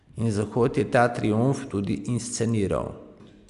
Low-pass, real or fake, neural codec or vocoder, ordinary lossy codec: 10.8 kHz; real; none; Opus, 64 kbps